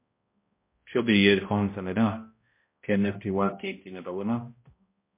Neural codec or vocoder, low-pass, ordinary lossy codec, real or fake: codec, 16 kHz, 0.5 kbps, X-Codec, HuBERT features, trained on balanced general audio; 3.6 kHz; MP3, 24 kbps; fake